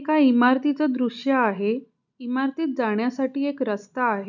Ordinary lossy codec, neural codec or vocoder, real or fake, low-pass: none; none; real; 7.2 kHz